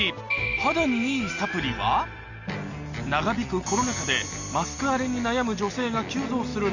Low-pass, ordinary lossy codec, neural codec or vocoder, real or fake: 7.2 kHz; none; none; real